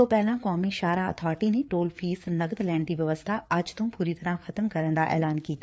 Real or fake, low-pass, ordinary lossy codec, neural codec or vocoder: fake; none; none; codec, 16 kHz, 4 kbps, FreqCodec, larger model